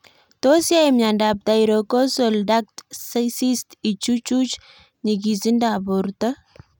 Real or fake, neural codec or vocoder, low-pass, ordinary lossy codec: real; none; 19.8 kHz; none